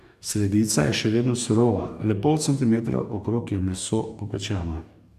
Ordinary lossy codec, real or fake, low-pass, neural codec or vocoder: none; fake; 14.4 kHz; codec, 44.1 kHz, 2.6 kbps, DAC